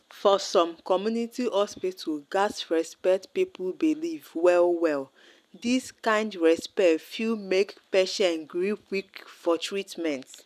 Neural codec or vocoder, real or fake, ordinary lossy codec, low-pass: none; real; none; 14.4 kHz